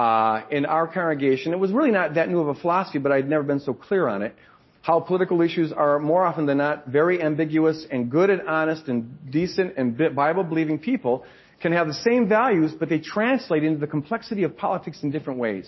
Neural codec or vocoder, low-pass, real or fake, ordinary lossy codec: none; 7.2 kHz; real; MP3, 24 kbps